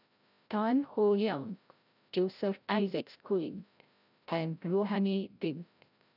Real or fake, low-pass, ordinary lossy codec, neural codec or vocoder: fake; 5.4 kHz; none; codec, 16 kHz, 0.5 kbps, FreqCodec, larger model